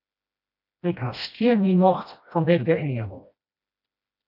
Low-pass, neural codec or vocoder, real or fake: 5.4 kHz; codec, 16 kHz, 1 kbps, FreqCodec, smaller model; fake